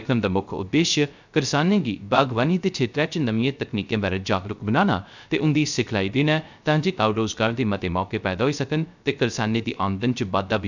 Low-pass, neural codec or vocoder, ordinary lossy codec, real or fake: 7.2 kHz; codec, 16 kHz, 0.3 kbps, FocalCodec; none; fake